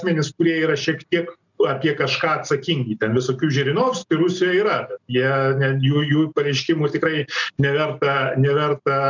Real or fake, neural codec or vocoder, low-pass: real; none; 7.2 kHz